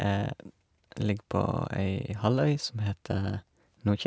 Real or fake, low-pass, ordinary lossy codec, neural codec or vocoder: real; none; none; none